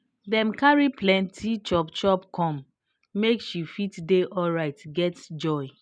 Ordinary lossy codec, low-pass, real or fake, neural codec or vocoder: none; 9.9 kHz; real; none